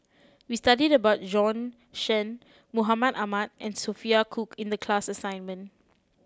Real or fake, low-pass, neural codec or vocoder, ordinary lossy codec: real; none; none; none